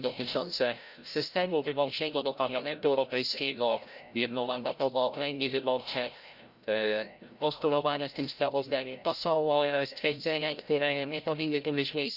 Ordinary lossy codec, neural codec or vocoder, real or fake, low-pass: Opus, 64 kbps; codec, 16 kHz, 0.5 kbps, FreqCodec, larger model; fake; 5.4 kHz